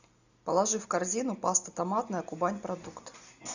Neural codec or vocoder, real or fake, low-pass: none; real; 7.2 kHz